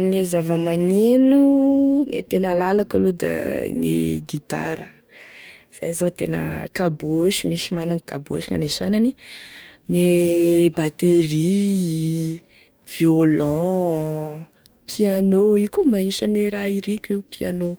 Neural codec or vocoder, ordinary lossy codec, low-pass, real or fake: codec, 44.1 kHz, 2.6 kbps, DAC; none; none; fake